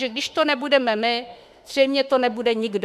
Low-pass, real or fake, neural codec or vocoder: 14.4 kHz; fake; autoencoder, 48 kHz, 32 numbers a frame, DAC-VAE, trained on Japanese speech